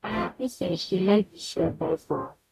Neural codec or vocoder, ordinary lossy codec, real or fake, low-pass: codec, 44.1 kHz, 0.9 kbps, DAC; none; fake; 14.4 kHz